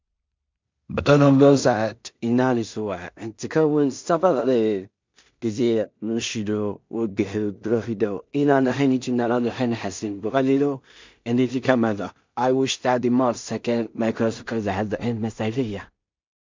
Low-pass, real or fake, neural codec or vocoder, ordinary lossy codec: 7.2 kHz; fake; codec, 16 kHz in and 24 kHz out, 0.4 kbps, LongCat-Audio-Codec, two codebook decoder; MP3, 64 kbps